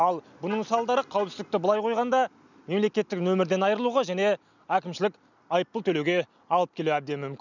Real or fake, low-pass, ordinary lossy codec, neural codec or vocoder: real; 7.2 kHz; none; none